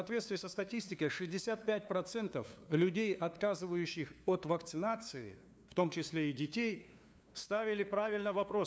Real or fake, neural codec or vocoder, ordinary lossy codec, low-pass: fake; codec, 16 kHz, 2 kbps, FunCodec, trained on LibriTTS, 25 frames a second; none; none